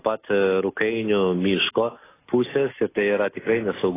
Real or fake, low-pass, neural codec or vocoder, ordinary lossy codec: real; 3.6 kHz; none; AAC, 16 kbps